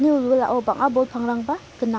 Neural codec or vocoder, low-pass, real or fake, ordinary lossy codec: none; none; real; none